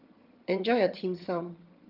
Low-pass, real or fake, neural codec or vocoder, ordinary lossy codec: 5.4 kHz; fake; vocoder, 22.05 kHz, 80 mel bands, HiFi-GAN; Opus, 24 kbps